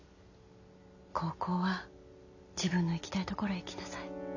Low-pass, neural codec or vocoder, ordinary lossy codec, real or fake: 7.2 kHz; none; none; real